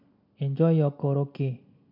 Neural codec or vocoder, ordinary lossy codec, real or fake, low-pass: none; MP3, 48 kbps; real; 5.4 kHz